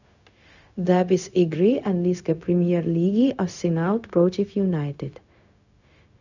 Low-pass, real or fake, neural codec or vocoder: 7.2 kHz; fake; codec, 16 kHz, 0.4 kbps, LongCat-Audio-Codec